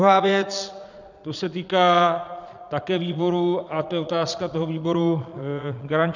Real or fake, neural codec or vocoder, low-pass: fake; vocoder, 22.05 kHz, 80 mel bands, Vocos; 7.2 kHz